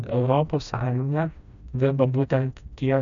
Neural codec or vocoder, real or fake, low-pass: codec, 16 kHz, 1 kbps, FreqCodec, smaller model; fake; 7.2 kHz